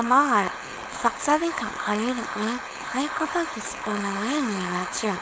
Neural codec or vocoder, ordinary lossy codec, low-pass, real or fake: codec, 16 kHz, 4.8 kbps, FACodec; none; none; fake